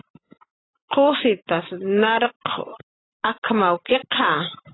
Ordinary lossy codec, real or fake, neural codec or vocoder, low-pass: AAC, 16 kbps; real; none; 7.2 kHz